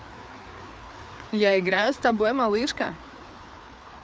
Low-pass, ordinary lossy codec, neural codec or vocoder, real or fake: none; none; codec, 16 kHz, 4 kbps, FreqCodec, larger model; fake